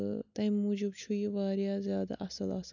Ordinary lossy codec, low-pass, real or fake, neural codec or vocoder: none; 7.2 kHz; real; none